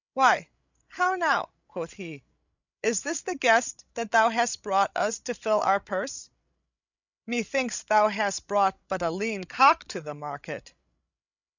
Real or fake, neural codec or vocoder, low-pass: fake; codec, 16 kHz, 16 kbps, FreqCodec, larger model; 7.2 kHz